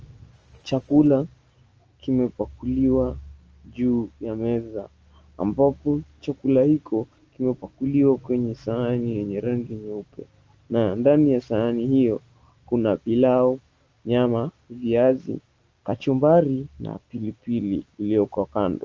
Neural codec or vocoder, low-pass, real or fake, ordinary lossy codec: none; 7.2 kHz; real; Opus, 24 kbps